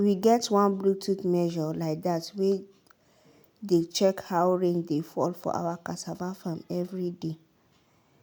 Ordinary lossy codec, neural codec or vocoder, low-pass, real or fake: none; none; none; real